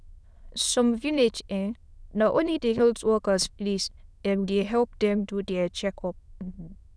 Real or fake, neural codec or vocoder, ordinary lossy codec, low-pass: fake; autoencoder, 22.05 kHz, a latent of 192 numbers a frame, VITS, trained on many speakers; none; none